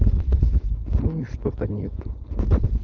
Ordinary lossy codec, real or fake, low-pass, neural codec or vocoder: none; fake; 7.2 kHz; codec, 16 kHz, 4.8 kbps, FACodec